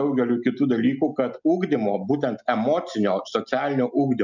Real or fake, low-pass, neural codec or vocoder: real; 7.2 kHz; none